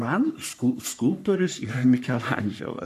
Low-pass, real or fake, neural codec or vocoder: 14.4 kHz; fake; codec, 44.1 kHz, 3.4 kbps, Pupu-Codec